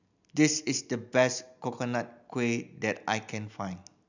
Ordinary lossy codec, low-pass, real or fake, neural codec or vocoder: none; 7.2 kHz; real; none